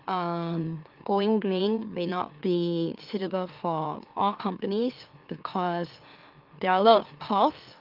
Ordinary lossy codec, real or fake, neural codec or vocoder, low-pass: Opus, 32 kbps; fake; autoencoder, 44.1 kHz, a latent of 192 numbers a frame, MeloTTS; 5.4 kHz